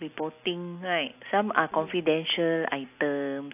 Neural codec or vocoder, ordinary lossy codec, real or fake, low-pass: none; AAC, 32 kbps; real; 3.6 kHz